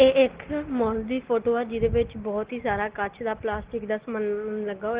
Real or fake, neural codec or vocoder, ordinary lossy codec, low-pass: real; none; Opus, 16 kbps; 3.6 kHz